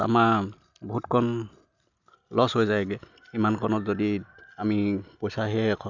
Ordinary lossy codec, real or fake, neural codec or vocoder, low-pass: none; real; none; 7.2 kHz